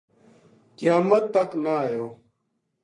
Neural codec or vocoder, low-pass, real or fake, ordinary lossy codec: codec, 44.1 kHz, 3.4 kbps, Pupu-Codec; 10.8 kHz; fake; MP3, 48 kbps